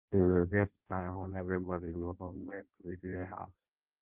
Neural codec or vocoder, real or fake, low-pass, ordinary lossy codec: codec, 16 kHz in and 24 kHz out, 0.6 kbps, FireRedTTS-2 codec; fake; 3.6 kHz; Opus, 32 kbps